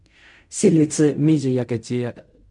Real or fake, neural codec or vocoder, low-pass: fake; codec, 16 kHz in and 24 kHz out, 0.4 kbps, LongCat-Audio-Codec, fine tuned four codebook decoder; 10.8 kHz